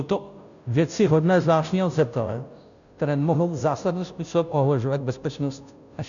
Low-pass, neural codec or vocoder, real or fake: 7.2 kHz; codec, 16 kHz, 0.5 kbps, FunCodec, trained on Chinese and English, 25 frames a second; fake